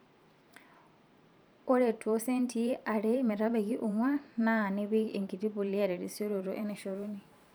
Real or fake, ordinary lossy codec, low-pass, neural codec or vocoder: fake; none; none; vocoder, 44.1 kHz, 128 mel bands every 512 samples, BigVGAN v2